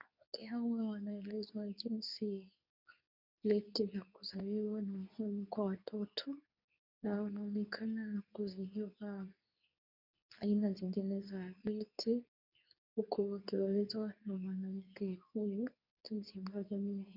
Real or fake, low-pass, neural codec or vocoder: fake; 5.4 kHz; codec, 24 kHz, 0.9 kbps, WavTokenizer, medium speech release version 2